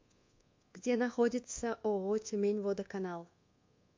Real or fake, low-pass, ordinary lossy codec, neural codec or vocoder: fake; 7.2 kHz; MP3, 48 kbps; codec, 24 kHz, 1.2 kbps, DualCodec